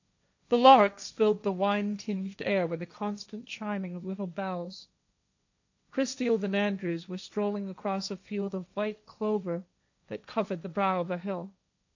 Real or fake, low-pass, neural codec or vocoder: fake; 7.2 kHz; codec, 16 kHz, 1.1 kbps, Voila-Tokenizer